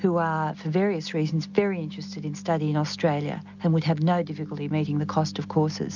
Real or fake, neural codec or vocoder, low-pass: real; none; 7.2 kHz